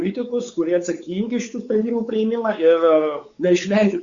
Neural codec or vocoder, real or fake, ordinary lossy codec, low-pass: codec, 16 kHz, 4 kbps, X-Codec, WavLM features, trained on Multilingual LibriSpeech; fake; Opus, 64 kbps; 7.2 kHz